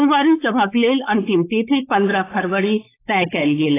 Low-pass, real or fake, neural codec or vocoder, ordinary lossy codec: 3.6 kHz; fake; codec, 16 kHz, 4.8 kbps, FACodec; AAC, 16 kbps